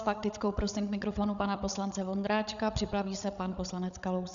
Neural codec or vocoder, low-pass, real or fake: codec, 16 kHz, 8 kbps, FreqCodec, larger model; 7.2 kHz; fake